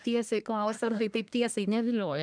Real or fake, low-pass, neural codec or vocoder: fake; 9.9 kHz; codec, 24 kHz, 1 kbps, SNAC